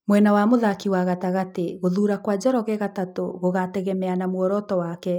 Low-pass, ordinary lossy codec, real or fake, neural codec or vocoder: 19.8 kHz; none; real; none